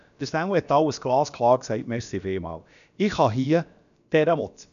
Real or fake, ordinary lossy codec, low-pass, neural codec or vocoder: fake; none; 7.2 kHz; codec, 16 kHz, about 1 kbps, DyCAST, with the encoder's durations